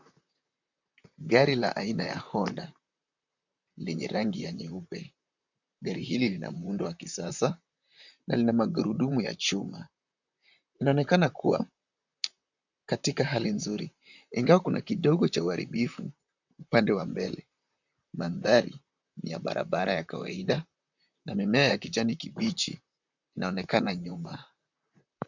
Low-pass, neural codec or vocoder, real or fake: 7.2 kHz; vocoder, 44.1 kHz, 128 mel bands, Pupu-Vocoder; fake